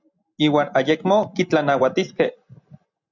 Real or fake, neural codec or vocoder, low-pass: real; none; 7.2 kHz